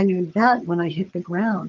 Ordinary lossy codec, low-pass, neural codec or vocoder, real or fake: Opus, 32 kbps; 7.2 kHz; vocoder, 22.05 kHz, 80 mel bands, HiFi-GAN; fake